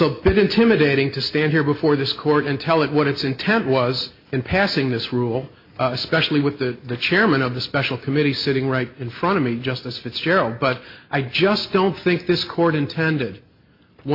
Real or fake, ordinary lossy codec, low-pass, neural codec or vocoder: real; MP3, 32 kbps; 5.4 kHz; none